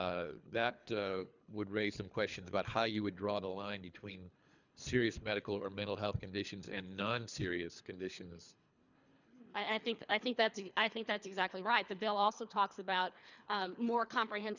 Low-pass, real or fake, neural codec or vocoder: 7.2 kHz; fake; codec, 24 kHz, 3 kbps, HILCodec